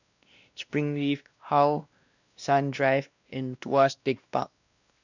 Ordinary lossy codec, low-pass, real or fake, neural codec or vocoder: none; 7.2 kHz; fake; codec, 16 kHz, 1 kbps, X-Codec, WavLM features, trained on Multilingual LibriSpeech